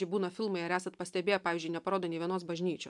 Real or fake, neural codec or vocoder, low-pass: real; none; 10.8 kHz